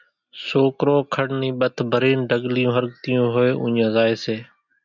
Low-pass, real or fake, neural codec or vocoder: 7.2 kHz; real; none